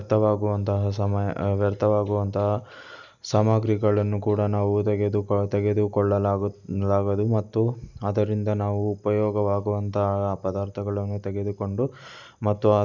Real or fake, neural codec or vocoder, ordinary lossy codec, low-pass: real; none; none; 7.2 kHz